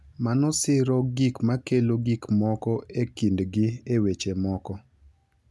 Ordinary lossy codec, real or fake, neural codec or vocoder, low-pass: none; real; none; none